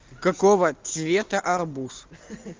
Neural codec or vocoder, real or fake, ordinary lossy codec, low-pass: none; real; Opus, 32 kbps; 7.2 kHz